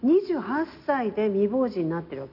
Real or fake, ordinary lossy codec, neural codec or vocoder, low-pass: real; none; none; 5.4 kHz